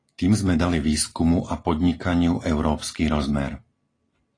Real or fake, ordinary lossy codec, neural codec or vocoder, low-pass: real; AAC, 32 kbps; none; 9.9 kHz